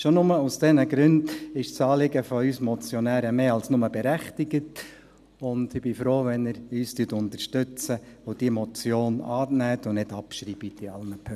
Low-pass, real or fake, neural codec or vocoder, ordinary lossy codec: 14.4 kHz; real; none; none